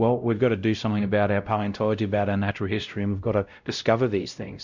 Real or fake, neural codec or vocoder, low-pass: fake; codec, 16 kHz, 0.5 kbps, X-Codec, WavLM features, trained on Multilingual LibriSpeech; 7.2 kHz